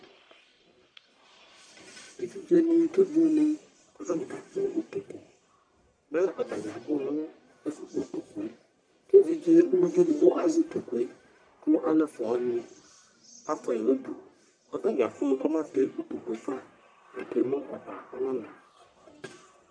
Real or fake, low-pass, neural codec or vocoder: fake; 9.9 kHz; codec, 44.1 kHz, 1.7 kbps, Pupu-Codec